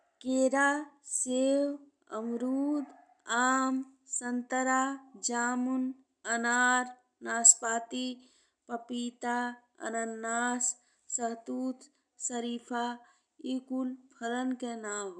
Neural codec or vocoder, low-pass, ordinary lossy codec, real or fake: none; 9.9 kHz; none; real